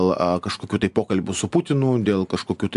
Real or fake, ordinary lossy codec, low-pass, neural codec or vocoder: real; AAC, 96 kbps; 10.8 kHz; none